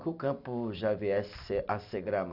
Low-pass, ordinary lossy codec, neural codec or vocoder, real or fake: 5.4 kHz; none; none; real